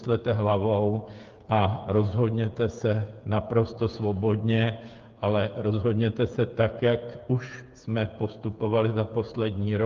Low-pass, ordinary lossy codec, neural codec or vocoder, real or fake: 7.2 kHz; Opus, 32 kbps; codec, 16 kHz, 8 kbps, FreqCodec, smaller model; fake